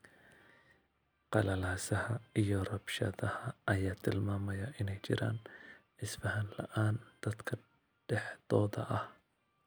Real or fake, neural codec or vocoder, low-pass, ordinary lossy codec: real; none; none; none